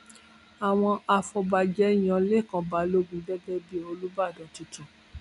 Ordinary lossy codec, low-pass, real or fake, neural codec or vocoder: none; 10.8 kHz; real; none